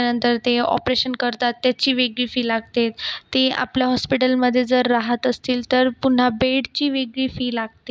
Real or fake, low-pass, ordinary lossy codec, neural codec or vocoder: real; none; none; none